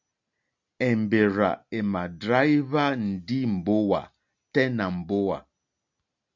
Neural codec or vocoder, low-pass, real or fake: none; 7.2 kHz; real